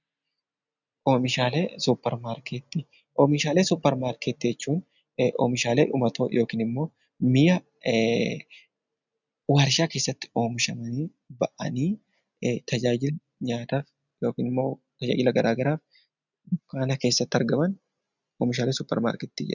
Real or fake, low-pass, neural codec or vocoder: real; 7.2 kHz; none